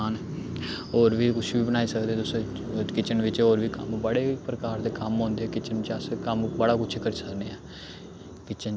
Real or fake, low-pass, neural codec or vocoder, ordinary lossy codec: real; none; none; none